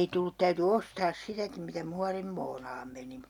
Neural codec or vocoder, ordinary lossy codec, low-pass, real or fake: none; none; 19.8 kHz; real